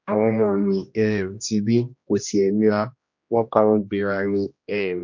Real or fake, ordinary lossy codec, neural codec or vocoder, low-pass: fake; MP3, 48 kbps; codec, 16 kHz, 1 kbps, X-Codec, HuBERT features, trained on general audio; 7.2 kHz